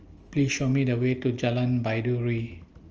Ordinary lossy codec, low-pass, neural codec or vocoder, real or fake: Opus, 24 kbps; 7.2 kHz; none; real